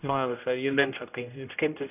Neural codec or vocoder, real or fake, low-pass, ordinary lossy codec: codec, 16 kHz, 0.5 kbps, X-Codec, HuBERT features, trained on general audio; fake; 3.6 kHz; none